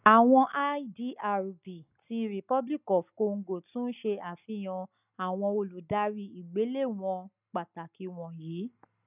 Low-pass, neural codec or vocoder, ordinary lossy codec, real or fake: 3.6 kHz; none; none; real